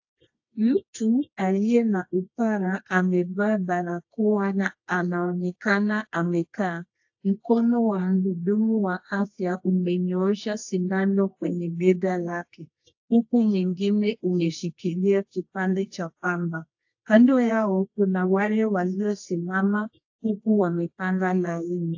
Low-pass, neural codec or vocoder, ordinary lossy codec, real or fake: 7.2 kHz; codec, 24 kHz, 0.9 kbps, WavTokenizer, medium music audio release; AAC, 48 kbps; fake